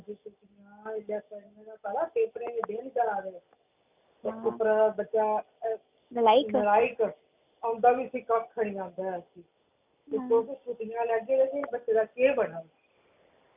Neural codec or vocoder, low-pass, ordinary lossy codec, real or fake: none; 3.6 kHz; none; real